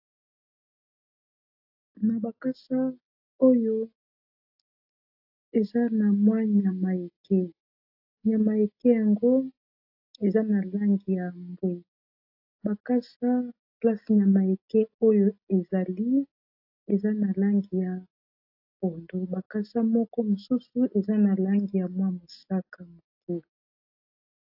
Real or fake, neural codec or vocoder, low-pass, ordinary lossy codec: real; none; 5.4 kHz; AAC, 48 kbps